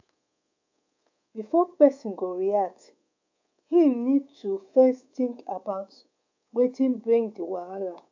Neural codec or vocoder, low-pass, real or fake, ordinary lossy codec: codec, 24 kHz, 3.1 kbps, DualCodec; 7.2 kHz; fake; AAC, 48 kbps